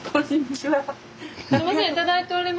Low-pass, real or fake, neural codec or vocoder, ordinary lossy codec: none; real; none; none